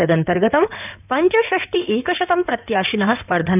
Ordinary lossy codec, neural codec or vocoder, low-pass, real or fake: none; codec, 16 kHz in and 24 kHz out, 2.2 kbps, FireRedTTS-2 codec; 3.6 kHz; fake